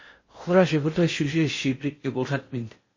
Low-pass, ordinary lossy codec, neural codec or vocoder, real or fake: 7.2 kHz; MP3, 32 kbps; codec, 16 kHz in and 24 kHz out, 0.6 kbps, FocalCodec, streaming, 4096 codes; fake